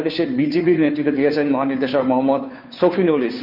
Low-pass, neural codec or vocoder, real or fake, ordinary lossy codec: 5.4 kHz; codec, 16 kHz, 2 kbps, FunCodec, trained on Chinese and English, 25 frames a second; fake; none